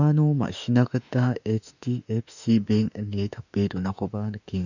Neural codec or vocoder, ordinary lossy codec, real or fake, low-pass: autoencoder, 48 kHz, 32 numbers a frame, DAC-VAE, trained on Japanese speech; none; fake; 7.2 kHz